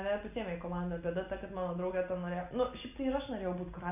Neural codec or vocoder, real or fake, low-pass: none; real; 3.6 kHz